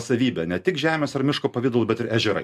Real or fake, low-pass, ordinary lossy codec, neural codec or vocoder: real; 14.4 kHz; MP3, 96 kbps; none